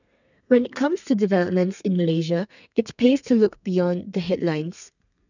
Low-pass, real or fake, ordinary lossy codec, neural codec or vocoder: 7.2 kHz; fake; none; codec, 32 kHz, 1.9 kbps, SNAC